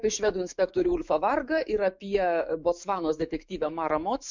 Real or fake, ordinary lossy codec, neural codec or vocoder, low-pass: fake; MP3, 64 kbps; autoencoder, 48 kHz, 128 numbers a frame, DAC-VAE, trained on Japanese speech; 7.2 kHz